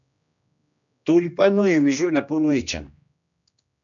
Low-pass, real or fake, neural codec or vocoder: 7.2 kHz; fake; codec, 16 kHz, 1 kbps, X-Codec, HuBERT features, trained on general audio